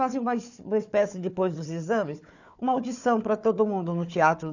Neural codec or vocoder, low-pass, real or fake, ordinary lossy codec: codec, 16 kHz, 4 kbps, FunCodec, trained on Chinese and English, 50 frames a second; 7.2 kHz; fake; none